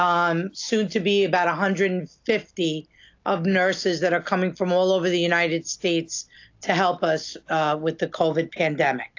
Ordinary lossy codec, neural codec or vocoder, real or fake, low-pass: AAC, 48 kbps; none; real; 7.2 kHz